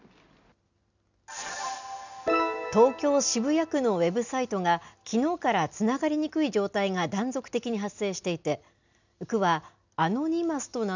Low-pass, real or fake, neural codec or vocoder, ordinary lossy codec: 7.2 kHz; real; none; none